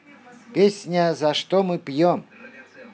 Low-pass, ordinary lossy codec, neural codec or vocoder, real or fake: none; none; none; real